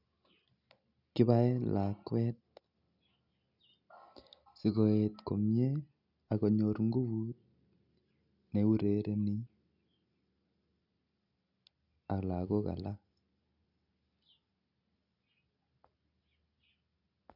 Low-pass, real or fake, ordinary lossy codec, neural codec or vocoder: 5.4 kHz; real; none; none